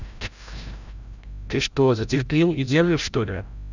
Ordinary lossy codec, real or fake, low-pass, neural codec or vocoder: none; fake; 7.2 kHz; codec, 16 kHz, 0.5 kbps, FreqCodec, larger model